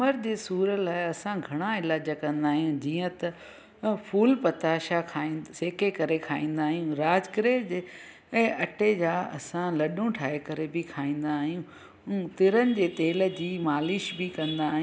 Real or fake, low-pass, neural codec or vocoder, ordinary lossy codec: real; none; none; none